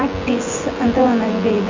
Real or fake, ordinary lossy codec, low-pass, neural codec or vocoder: fake; Opus, 32 kbps; 7.2 kHz; vocoder, 24 kHz, 100 mel bands, Vocos